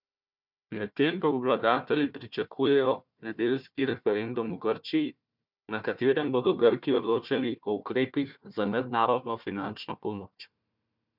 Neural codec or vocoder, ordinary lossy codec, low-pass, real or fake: codec, 16 kHz, 1 kbps, FunCodec, trained on Chinese and English, 50 frames a second; none; 5.4 kHz; fake